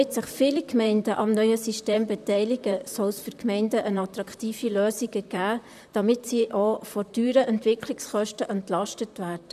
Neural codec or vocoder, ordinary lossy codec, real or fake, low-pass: vocoder, 44.1 kHz, 128 mel bands, Pupu-Vocoder; none; fake; 14.4 kHz